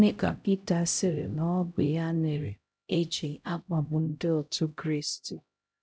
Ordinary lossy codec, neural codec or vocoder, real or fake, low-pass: none; codec, 16 kHz, 0.5 kbps, X-Codec, HuBERT features, trained on LibriSpeech; fake; none